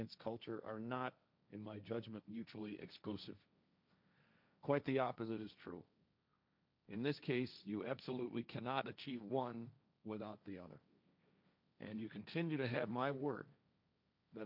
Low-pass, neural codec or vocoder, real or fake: 5.4 kHz; codec, 16 kHz, 1.1 kbps, Voila-Tokenizer; fake